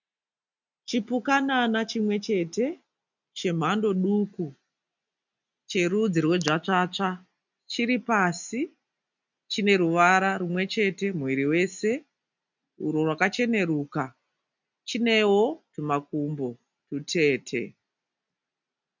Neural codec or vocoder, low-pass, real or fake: none; 7.2 kHz; real